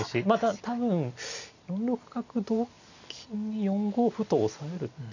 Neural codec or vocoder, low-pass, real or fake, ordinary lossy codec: none; 7.2 kHz; real; none